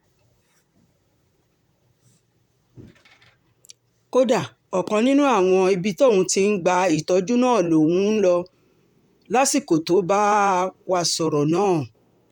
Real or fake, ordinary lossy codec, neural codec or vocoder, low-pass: fake; none; vocoder, 44.1 kHz, 128 mel bands, Pupu-Vocoder; 19.8 kHz